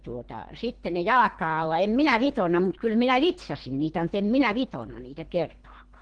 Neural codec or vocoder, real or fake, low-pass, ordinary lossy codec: codec, 24 kHz, 3 kbps, HILCodec; fake; 9.9 kHz; Opus, 16 kbps